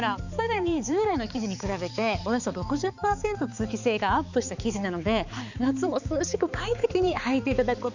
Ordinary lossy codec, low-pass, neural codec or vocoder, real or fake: none; 7.2 kHz; codec, 16 kHz, 4 kbps, X-Codec, HuBERT features, trained on balanced general audio; fake